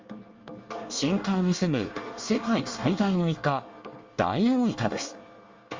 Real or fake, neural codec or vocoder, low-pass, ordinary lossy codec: fake; codec, 24 kHz, 1 kbps, SNAC; 7.2 kHz; Opus, 32 kbps